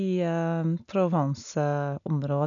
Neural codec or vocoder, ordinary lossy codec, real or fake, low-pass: none; none; real; 7.2 kHz